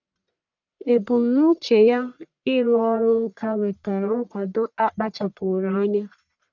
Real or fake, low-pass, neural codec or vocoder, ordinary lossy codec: fake; 7.2 kHz; codec, 44.1 kHz, 1.7 kbps, Pupu-Codec; none